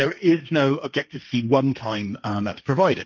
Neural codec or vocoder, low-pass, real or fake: codec, 16 kHz, 1.1 kbps, Voila-Tokenizer; 7.2 kHz; fake